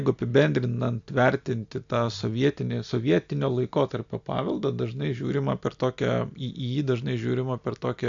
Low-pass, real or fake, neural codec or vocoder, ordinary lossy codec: 7.2 kHz; real; none; AAC, 64 kbps